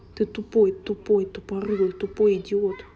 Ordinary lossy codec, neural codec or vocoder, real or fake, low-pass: none; none; real; none